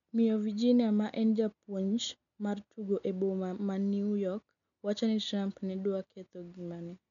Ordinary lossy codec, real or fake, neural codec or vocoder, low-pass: none; real; none; 7.2 kHz